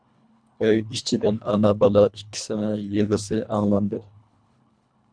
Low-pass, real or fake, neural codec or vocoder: 9.9 kHz; fake; codec, 24 kHz, 1.5 kbps, HILCodec